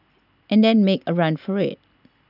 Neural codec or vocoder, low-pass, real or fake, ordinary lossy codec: none; 5.4 kHz; real; none